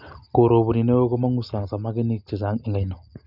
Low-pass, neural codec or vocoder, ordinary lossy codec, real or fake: 5.4 kHz; none; none; real